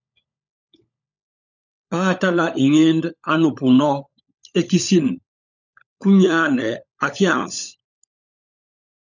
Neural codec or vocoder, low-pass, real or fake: codec, 16 kHz, 16 kbps, FunCodec, trained on LibriTTS, 50 frames a second; 7.2 kHz; fake